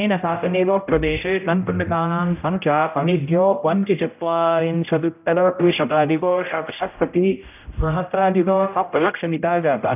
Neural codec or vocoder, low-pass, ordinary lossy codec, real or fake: codec, 16 kHz, 0.5 kbps, X-Codec, HuBERT features, trained on general audio; 3.6 kHz; none; fake